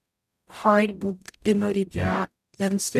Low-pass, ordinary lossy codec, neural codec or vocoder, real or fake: 14.4 kHz; none; codec, 44.1 kHz, 0.9 kbps, DAC; fake